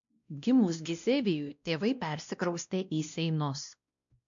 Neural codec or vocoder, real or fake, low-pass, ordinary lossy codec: codec, 16 kHz, 1 kbps, X-Codec, WavLM features, trained on Multilingual LibriSpeech; fake; 7.2 kHz; MP3, 64 kbps